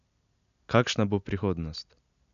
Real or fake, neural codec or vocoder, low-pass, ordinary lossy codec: real; none; 7.2 kHz; none